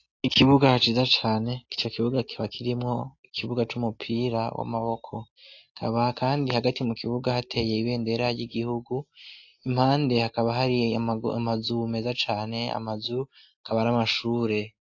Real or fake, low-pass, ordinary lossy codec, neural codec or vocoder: real; 7.2 kHz; AAC, 48 kbps; none